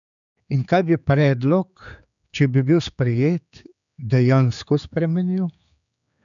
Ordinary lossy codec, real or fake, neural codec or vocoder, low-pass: none; fake; codec, 16 kHz, 4 kbps, X-Codec, HuBERT features, trained on general audio; 7.2 kHz